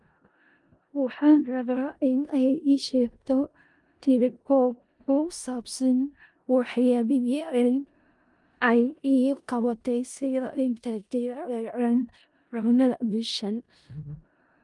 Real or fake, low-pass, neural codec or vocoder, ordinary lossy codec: fake; 10.8 kHz; codec, 16 kHz in and 24 kHz out, 0.4 kbps, LongCat-Audio-Codec, four codebook decoder; Opus, 32 kbps